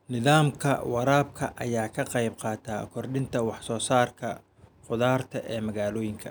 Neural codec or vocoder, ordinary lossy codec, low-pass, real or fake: none; none; none; real